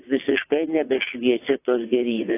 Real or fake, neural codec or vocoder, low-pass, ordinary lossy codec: fake; vocoder, 22.05 kHz, 80 mel bands, WaveNeXt; 3.6 kHz; AAC, 24 kbps